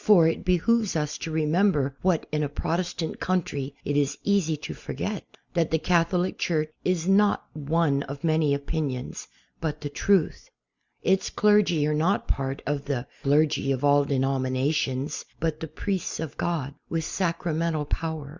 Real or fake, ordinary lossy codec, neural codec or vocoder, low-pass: real; Opus, 64 kbps; none; 7.2 kHz